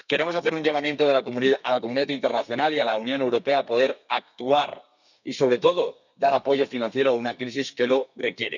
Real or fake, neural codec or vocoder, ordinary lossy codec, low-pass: fake; codec, 32 kHz, 1.9 kbps, SNAC; none; 7.2 kHz